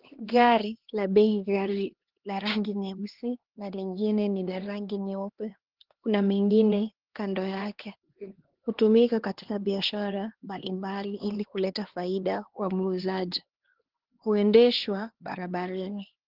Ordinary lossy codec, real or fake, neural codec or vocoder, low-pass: Opus, 16 kbps; fake; codec, 16 kHz, 2 kbps, X-Codec, HuBERT features, trained on LibriSpeech; 5.4 kHz